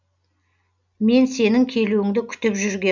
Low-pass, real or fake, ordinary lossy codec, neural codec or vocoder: 7.2 kHz; real; none; none